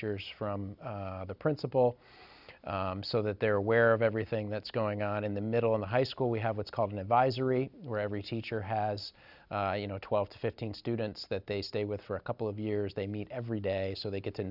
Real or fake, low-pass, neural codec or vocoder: real; 5.4 kHz; none